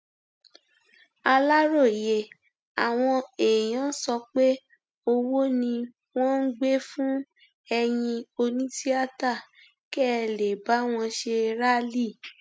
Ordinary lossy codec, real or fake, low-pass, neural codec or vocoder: none; real; none; none